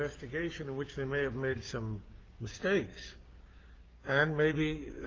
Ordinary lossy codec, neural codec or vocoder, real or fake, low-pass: Opus, 32 kbps; codec, 16 kHz, 6 kbps, DAC; fake; 7.2 kHz